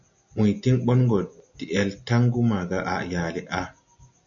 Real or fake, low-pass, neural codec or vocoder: real; 7.2 kHz; none